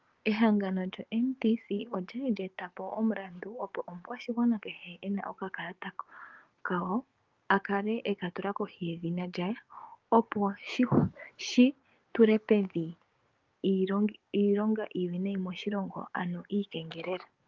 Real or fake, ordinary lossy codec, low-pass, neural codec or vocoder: fake; Opus, 32 kbps; 7.2 kHz; codec, 16 kHz, 6 kbps, DAC